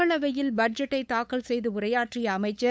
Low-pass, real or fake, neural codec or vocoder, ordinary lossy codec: none; fake; codec, 16 kHz, 8 kbps, FunCodec, trained on LibriTTS, 25 frames a second; none